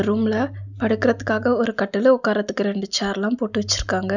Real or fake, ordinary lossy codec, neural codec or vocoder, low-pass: real; none; none; 7.2 kHz